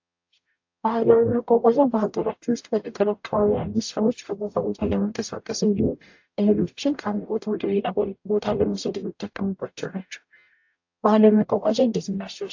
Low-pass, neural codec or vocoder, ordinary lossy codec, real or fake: 7.2 kHz; codec, 44.1 kHz, 0.9 kbps, DAC; AAC, 48 kbps; fake